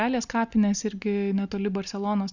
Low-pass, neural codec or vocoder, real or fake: 7.2 kHz; none; real